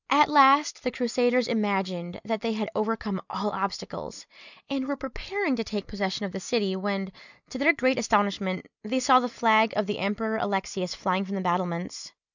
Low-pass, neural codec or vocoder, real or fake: 7.2 kHz; none; real